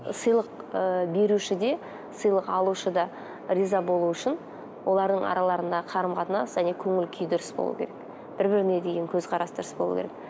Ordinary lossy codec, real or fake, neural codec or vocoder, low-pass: none; real; none; none